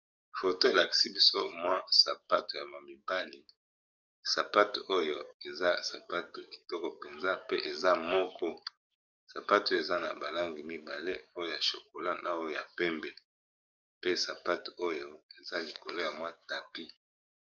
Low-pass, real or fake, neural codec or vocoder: 7.2 kHz; fake; codec, 44.1 kHz, 7.8 kbps, DAC